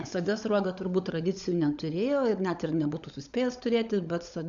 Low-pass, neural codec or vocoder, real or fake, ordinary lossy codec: 7.2 kHz; codec, 16 kHz, 16 kbps, FunCodec, trained on LibriTTS, 50 frames a second; fake; Opus, 64 kbps